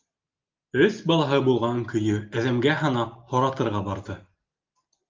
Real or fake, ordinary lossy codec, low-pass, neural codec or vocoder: real; Opus, 24 kbps; 7.2 kHz; none